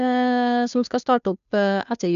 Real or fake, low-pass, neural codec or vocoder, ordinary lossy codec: fake; 7.2 kHz; codec, 16 kHz, 2 kbps, FunCodec, trained on Chinese and English, 25 frames a second; none